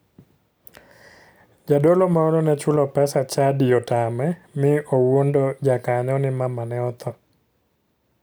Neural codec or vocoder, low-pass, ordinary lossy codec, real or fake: none; none; none; real